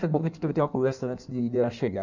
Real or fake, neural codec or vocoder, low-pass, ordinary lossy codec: fake; codec, 16 kHz in and 24 kHz out, 1.1 kbps, FireRedTTS-2 codec; 7.2 kHz; none